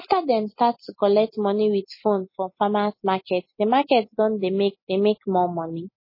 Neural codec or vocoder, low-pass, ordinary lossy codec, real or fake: none; 5.4 kHz; MP3, 24 kbps; real